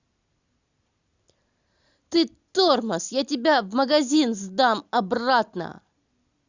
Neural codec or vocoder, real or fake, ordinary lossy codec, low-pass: none; real; Opus, 64 kbps; 7.2 kHz